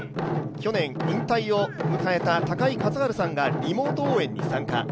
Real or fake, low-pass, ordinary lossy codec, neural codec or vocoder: real; none; none; none